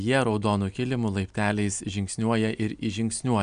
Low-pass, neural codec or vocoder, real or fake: 9.9 kHz; none; real